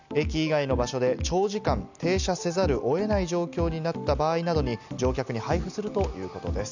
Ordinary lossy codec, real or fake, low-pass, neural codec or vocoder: none; real; 7.2 kHz; none